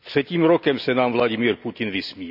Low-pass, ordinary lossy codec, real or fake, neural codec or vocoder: 5.4 kHz; none; real; none